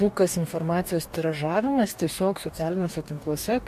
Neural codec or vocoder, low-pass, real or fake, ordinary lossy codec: codec, 44.1 kHz, 2.6 kbps, DAC; 14.4 kHz; fake; MP3, 64 kbps